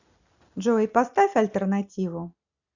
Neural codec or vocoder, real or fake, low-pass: none; real; 7.2 kHz